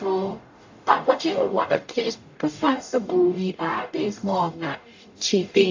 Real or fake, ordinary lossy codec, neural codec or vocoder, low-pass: fake; AAC, 48 kbps; codec, 44.1 kHz, 0.9 kbps, DAC; 7.2 kHz